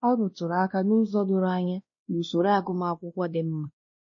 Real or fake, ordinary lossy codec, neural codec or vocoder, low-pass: fake; MP3, 32 kbps; codec, 16 kHz, 1 kbps, X-Codec, WavLM features, trained on Multilingual LibriSpeech; 7.2 kHz